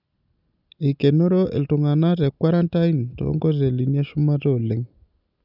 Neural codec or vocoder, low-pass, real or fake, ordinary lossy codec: none; 5.4 kHz; real; none